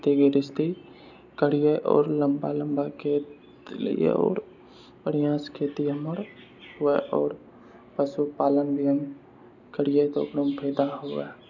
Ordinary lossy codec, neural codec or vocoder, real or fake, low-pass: none; none; real; 7.2 kHz